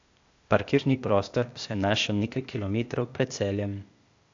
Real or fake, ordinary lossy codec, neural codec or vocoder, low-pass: fake; AAC, 64 kbps; codec, 16 kHz, 0.8 kbps, ZipCodec; 7.2 kHz